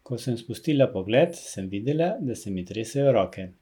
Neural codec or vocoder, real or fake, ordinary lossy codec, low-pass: none; real; none; 19.8 kHz